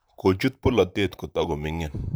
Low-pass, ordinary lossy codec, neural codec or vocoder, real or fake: none; none; vocoder, 44.1 kHz, 128 mel bands, Pupu-Vocoder; fake